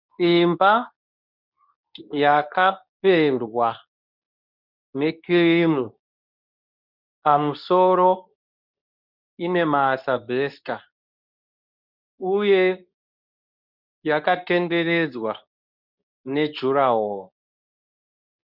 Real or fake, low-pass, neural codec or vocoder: fake; 5.4 kHz; codec, 24 kHz, 0.9 kbps, WavTokenizer, medium speech release version 2